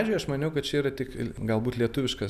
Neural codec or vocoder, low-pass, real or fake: none; 14.4 kHz; real